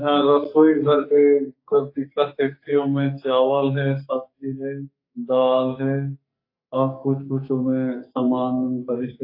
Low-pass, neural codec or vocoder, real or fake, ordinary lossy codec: 5.4 kHz; codec, 44.1 kHz, 2.6 kbps, SNAC; fake; AAC, 32 kbps